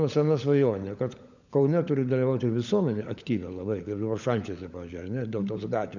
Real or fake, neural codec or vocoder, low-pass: fake; codec, 16 kHz, 16 kbps, FunCodec, trained on LibriTTS, 50 frames a second; 7.2 kHz